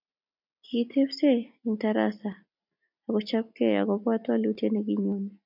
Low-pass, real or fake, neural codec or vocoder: 5.4 kHz; real; none